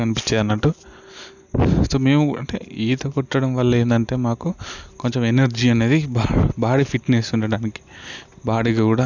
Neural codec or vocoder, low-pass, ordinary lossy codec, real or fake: none; 7.2 kHz; none; real